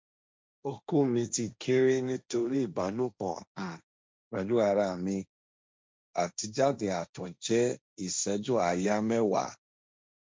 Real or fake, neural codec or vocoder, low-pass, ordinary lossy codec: fake; codec, 16 kHz, 1.1 kbps, Voila-Tokenizer; none; none